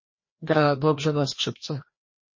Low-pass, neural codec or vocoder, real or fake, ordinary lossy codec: 7.2 kHz; codec, 16 kHz, 1 kbps, FreqCodec, larger model; fake; MP3, 32 kbps